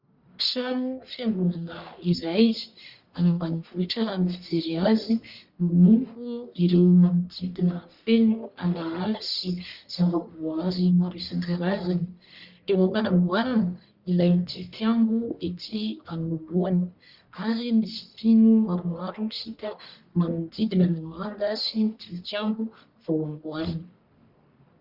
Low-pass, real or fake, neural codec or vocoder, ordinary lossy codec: 5.4 kHz; fake; codec, 44.1 kHz, 1.7 kbps, Pupu-Codec; Opus, 64 kbps